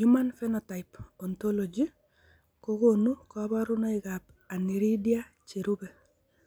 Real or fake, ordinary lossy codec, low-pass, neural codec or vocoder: real; none; none; none